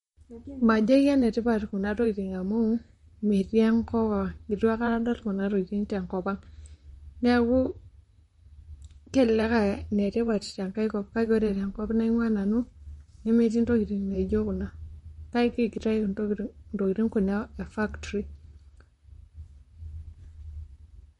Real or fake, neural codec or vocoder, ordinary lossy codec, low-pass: fake; vocoder, 44.1 kHz, 128 mel bands, Pupu-Vocoder; MP3, 48 kbps; 19.8 kHz